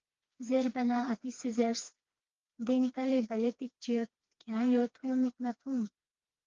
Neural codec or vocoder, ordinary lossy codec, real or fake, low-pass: codec, 16 kHz, 2 kbps, FreqCodec, smaller model; Opus, 24 kbps; fake; 7.2 kHz